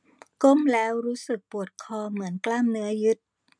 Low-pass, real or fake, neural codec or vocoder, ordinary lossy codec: 9.9 kHz; real; none; none